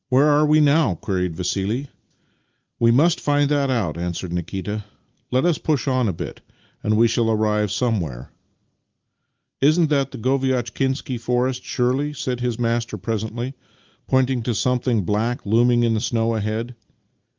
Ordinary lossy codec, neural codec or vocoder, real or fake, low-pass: Opus, 32 kbps; none; real; 7.2 kHz